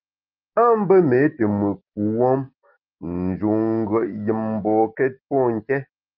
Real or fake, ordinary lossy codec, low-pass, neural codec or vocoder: real; Opus, 32 kbps; 5.4 kHz; none